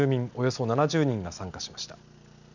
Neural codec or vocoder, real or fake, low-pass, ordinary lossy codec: none; real; 7.2 kHz; none